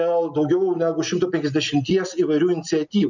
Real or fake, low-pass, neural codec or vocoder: real; 7.2 kHz; none